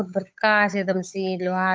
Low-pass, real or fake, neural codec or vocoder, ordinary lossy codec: none; fake; codec, 16 kHz, 8 kbps, FunCodec, trained on Chinese and English, 25 frames a second; none